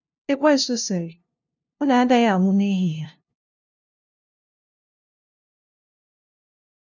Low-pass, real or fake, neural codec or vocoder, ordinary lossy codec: 7.2 kHz; fake; codec, 16 kHz, 0.5 kbps, FunCodec, trained on LibriTTS, 25 frames a second; none